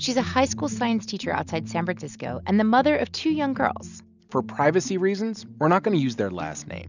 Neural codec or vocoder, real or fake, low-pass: none; real; 7.2 kHz